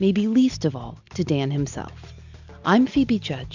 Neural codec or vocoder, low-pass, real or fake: none; 7.2 kHz; real